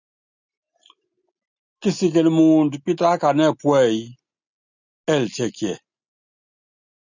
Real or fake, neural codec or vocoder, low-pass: real; none; 7.2 kHz